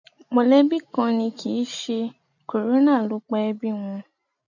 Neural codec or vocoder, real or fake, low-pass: none; real; 7.2 kHz